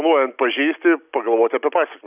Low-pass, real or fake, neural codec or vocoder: 3.6 kHz; real; none